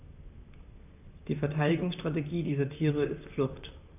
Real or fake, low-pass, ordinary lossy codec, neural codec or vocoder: fake; 3.6 kHz; none; vocoder, 44.1 kHz, 128 mel bands, Pupu-Vocoder